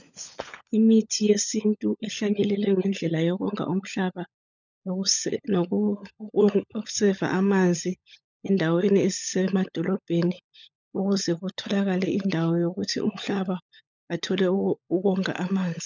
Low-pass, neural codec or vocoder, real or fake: 7.2 kHz; codec, 16 kHz, 16 kbps, FunCodec, trained on LibriTTS, 50 frames a second; fake